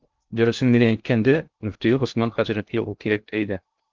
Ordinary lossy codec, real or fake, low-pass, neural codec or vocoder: Opus, 32 kbps; fake; 7.2 kHz; codec, 16 kHz in and 24 kHz out, 0.6 kbps, FocalCodec, streaming, 2048 codes